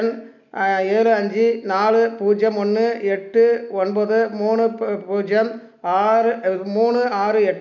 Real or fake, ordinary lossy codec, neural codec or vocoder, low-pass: real; none; none; 7.2 kHz